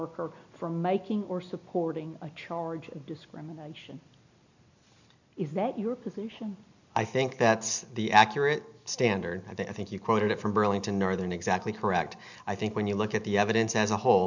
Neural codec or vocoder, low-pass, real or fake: none; 7.2 kHz; real